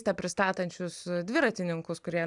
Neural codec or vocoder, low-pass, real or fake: none; 10.8 kHz; real